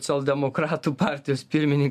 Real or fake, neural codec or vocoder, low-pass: real; none; 14.4 kHz